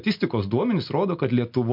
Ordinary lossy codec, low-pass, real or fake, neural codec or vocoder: MP3, 48 kbps; 5.4 kHz; real; none